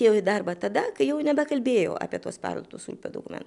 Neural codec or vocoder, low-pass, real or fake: none; 10.8 kHz; real